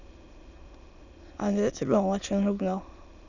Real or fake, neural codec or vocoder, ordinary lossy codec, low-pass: fake; autoencoder, 22.05 kHz, a latent of 192 numbers a frame, VITS, trained on many speakers; none; 7.2 kHz